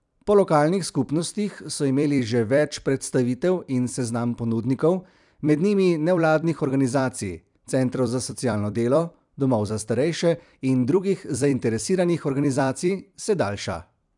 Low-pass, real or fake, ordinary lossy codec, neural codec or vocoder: 10.8 kHz; fake; none; vocoder, 44.1 kHz, 128 mel bands every 256 samples, BigVGAN v2